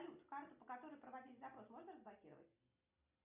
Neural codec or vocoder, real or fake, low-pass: none; real; 3.6 kHz